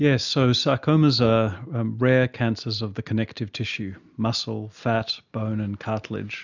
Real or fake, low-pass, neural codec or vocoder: real; 7.2 kHz; none